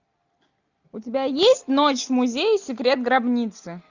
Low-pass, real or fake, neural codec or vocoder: 7.2 kHz; real; none